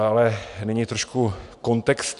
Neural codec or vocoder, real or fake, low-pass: none; real; 10.8 kHz